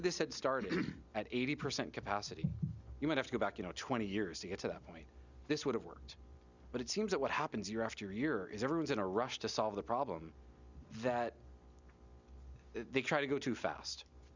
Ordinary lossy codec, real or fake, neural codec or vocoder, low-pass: Opus, 64 kbps; real; none; 7.2 kHz